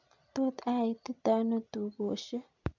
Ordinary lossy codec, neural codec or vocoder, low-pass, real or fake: none; none; 7.2 kHz; real